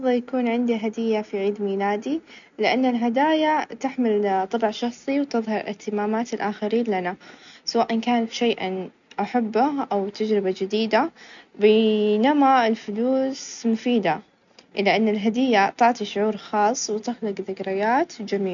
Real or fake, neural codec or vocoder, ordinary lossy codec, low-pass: real; none; none; 7.2 kHz